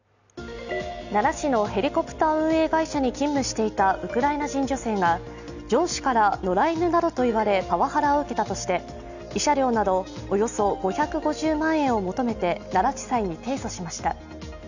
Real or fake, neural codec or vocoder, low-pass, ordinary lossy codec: real; none; 7.2 kHz; none